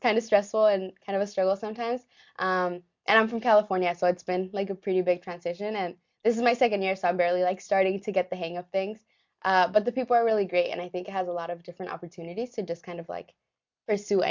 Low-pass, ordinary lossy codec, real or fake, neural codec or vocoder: 7.2 kHz; MP3, 64 kbps; real; none